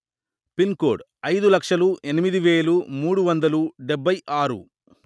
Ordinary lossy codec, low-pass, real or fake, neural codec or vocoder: none; none; real; none